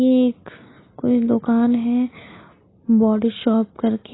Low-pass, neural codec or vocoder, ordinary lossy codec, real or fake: 7.2 kHz; none; AAC, 16 kbps; real